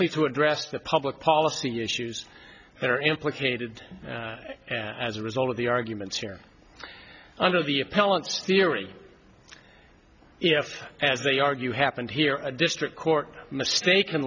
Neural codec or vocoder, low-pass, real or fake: none; 7.2 kHz; real